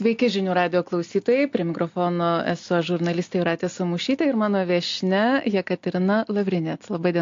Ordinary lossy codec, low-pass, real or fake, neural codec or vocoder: AAC, 48 kbps; 7.2 kHz; real; none